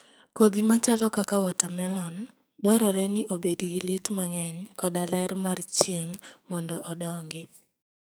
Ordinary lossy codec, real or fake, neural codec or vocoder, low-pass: none; fake; codec, 44.1 kHz, 2.6 kbps, SNAC; none